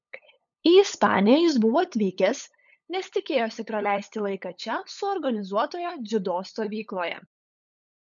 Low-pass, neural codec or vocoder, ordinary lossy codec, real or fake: 7.2 kHz; codec, 16 kHz, 8 kbps, FunCodec, trained on LibriTTS, 25 frames a second; MP3, 96 kbps; fake